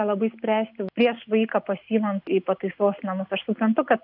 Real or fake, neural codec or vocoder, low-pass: real; none; 5.4 kHz